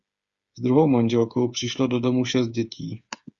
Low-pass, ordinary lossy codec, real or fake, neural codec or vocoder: 7.2 kHz; Opus, 64 kbps; fake; codec, 16 kHz, 8 kbps, FreqCodec, smaller model